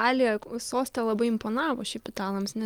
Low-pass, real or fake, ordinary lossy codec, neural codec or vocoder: 19.8 kHz; real; Opus, 32 kbps; none